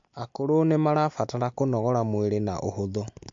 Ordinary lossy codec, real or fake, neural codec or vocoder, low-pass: MP3, 48 kbps; real; none; 7.2 kHz